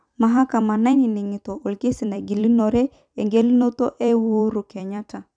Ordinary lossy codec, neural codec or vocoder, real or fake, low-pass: none; vocoder, 44.1 kHz, 128 mel bands every 256 samples, BigVGAN v2; fake; 9.9 kHz